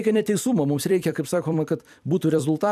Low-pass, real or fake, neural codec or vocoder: 14.4 kHz; fake; vocoder, 44.1 kHz, 128 mel bands every 256 samples, BigVGAN v2